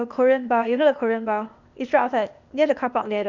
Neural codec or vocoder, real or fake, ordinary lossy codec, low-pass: codec, 16 kHz, 0.8 kbps, ZipCodec; fake; none; 7.2 kHz